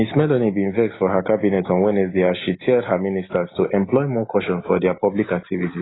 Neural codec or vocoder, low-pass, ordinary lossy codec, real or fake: none; 7.2 kHz; AAC, 16 kbps; real